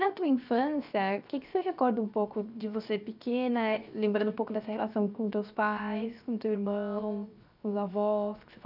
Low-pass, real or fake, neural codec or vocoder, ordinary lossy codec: 5.4 kHz; fake; codec, 16 kHz, 0.7 kbps, FocalCodec; none